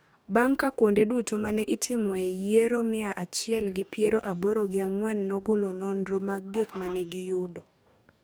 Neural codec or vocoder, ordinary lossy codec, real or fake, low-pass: codec, 44.1 kHz, 2.6 kbps, DAC; none; fake; none